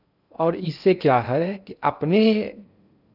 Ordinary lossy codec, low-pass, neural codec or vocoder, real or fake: none; 5.4 kHz; codec, 16 kHz in and 24 kHz out, 0.6 kbps, FocalCodec, streaming, 2048 codes; fake